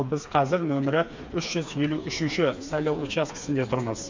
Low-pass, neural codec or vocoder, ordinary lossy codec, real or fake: 7.2 kHz; codec, 16 kHz, 4 kbps, FreqCodec, smaller model; MP3, 64 kbps; fake